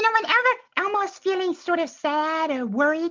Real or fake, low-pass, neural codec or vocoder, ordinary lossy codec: real; 7.2 kHz; none; MP3, 64 kbps